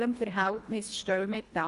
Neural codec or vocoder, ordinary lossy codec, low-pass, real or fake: codec, 24 kHz, 1.5 kbps, HILCodec; Opus, 64 kbps; 10.8 kHz; fake